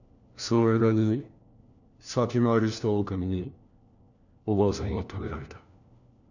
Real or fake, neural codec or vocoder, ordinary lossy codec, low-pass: fake; codec, 16 kHz, 1 kbps, FunCodec, trained on LibriTTS, 50 frames a second; none; 7.2 kHz